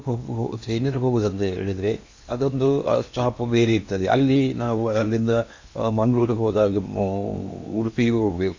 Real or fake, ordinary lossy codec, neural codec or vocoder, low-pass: fake; MP3, 64 kbps; codec, 16 kHz in and 24 kHz out, 0.8 kbps, FocalCodec, streaming, 65536 codes; 7.2 kHz